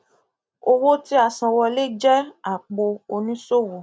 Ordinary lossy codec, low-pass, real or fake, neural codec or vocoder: none; none; real; none